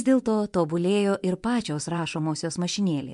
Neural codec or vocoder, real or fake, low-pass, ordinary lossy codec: vocoder, 24 kHz, 100 mel bands, Vocos; fake; 10.8 kHz; MP3, 64 kbps